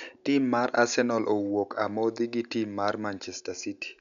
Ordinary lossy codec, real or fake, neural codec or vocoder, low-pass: none; real; none; 7.2 kHz